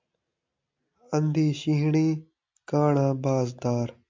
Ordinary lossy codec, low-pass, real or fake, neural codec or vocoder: MP3, 64 kbps; 7.2 kHz; real; none